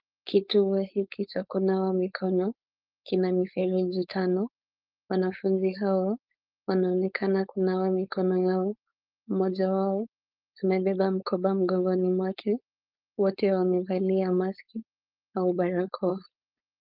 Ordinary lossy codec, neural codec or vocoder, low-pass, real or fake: Opus, 32 kbps; codec, 16 kHz, 4.8 kbps, FACodec; 5.4 kHz; fake